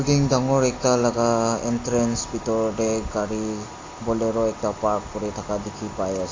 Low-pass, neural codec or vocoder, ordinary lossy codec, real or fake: 7.2 kHz; none; AAC, 32 kbps; real